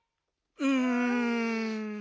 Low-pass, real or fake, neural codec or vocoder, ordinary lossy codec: none; real; none; none